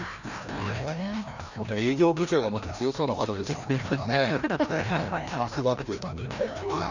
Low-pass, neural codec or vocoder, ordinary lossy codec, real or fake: 7.2 kHz; codec, 16 kHz, 1 kbps, FreqCodec, larger model; none; fake